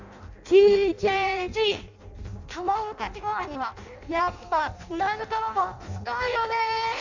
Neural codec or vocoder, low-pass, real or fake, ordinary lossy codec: codec, 16 kHz in and 24 kHz out, 0.6 kbps, FireRedTTS-2 codec; 7.2 kHz; fake; none